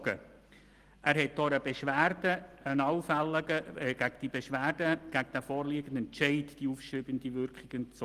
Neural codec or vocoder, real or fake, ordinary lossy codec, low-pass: none; real; Opus, 24 kbps; 14.4 kHz